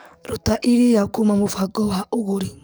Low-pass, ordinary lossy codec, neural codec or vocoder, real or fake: none; none; codec, 44.1 kHz, 7.8 kbps, DAC; fake